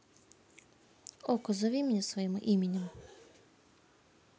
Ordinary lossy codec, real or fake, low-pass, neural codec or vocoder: none; real; none; none